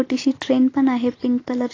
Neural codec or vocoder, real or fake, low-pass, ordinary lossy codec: none; real; 7.2 kHz; AAC, 32 kbps